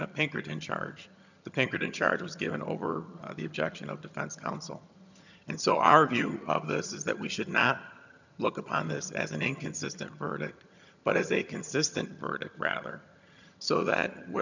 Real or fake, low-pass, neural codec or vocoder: fake; 7.2 kHz; vocoder, 22.05 kHz, 80 mel bands, HiFi-GAN